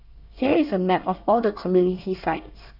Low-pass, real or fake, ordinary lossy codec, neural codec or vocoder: 5.4 kHz; fake; none; codec, 24 kHz, 1 kbps, SNAC